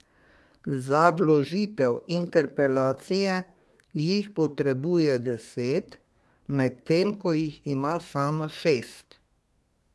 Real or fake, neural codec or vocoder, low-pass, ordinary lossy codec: fake; codec, 24 kHz, 1 kbps, SNAC; none; none